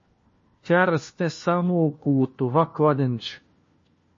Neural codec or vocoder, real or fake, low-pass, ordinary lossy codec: codec, 16 kHz, 1 kbps, FunCodec, trained on LibriTTS, 50 frames a second; fake; 7.2 kHz; MP3, 32 kbps